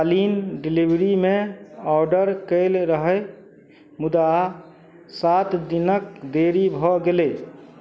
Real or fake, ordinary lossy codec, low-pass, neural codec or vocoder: real; none; none; none